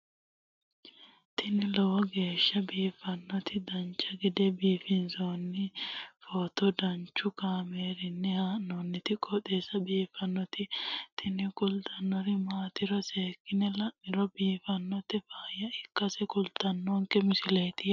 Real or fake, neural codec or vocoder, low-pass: real; none; 7.2 kHz